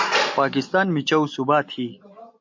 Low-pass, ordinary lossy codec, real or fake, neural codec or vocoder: 7.2 kHz; MP3, 64 kbps; real; none